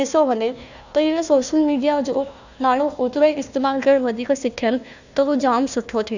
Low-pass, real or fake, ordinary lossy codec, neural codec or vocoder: 7.2 kHz; fake; none; codec, 16 kHz, 1 kbps, FunCodec, trained on Chinese and English, 50 frames a second